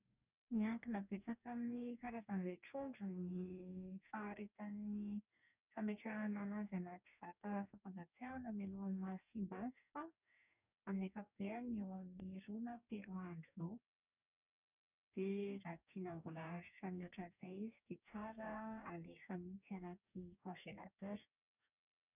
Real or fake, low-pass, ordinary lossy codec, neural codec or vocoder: fake; 3.6 kHz; none; codec, 44.1 kHz, 2.6 kbps, DAC